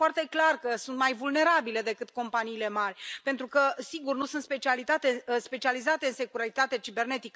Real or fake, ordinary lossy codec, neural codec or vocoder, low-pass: real; none; none; none